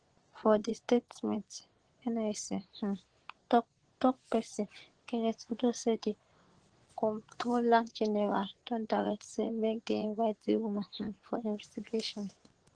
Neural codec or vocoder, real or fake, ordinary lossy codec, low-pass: vocoder, 24 kHz, 100 mel bands, Vocos; fake; Opus, 16 kbps; 9.9 kHz